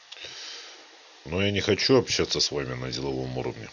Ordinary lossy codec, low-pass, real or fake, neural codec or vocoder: none; 7.2 kHz; real; none